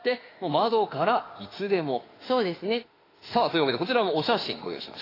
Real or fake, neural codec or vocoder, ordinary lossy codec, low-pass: fake; autoencoder, 48 kHz, 32 numbers a frame, DAC-VAE, trained on Japanese speech; AAC, 24 kbps; 5.4 kHz